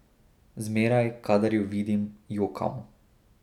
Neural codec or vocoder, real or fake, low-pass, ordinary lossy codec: none; real; 19.8 kHz; none